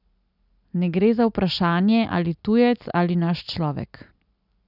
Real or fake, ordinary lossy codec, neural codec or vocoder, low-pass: real; none; none; 5.4 kHz